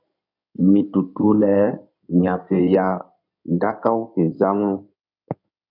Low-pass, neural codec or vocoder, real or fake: 5.4 kHz; codec, 16 kHz in and 24 kHz out, 2.2 kbps, FireRedTTS-2 codec; fake